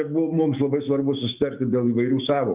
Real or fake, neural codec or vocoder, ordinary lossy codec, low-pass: fake; autoencoder, 48 kHz, 128 numbers a frame, DAC-VAE, trained on Japanese speech; Opus, 32 kbps; 3.6 kHz